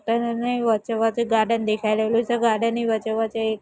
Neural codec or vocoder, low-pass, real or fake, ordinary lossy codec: none; none; real; none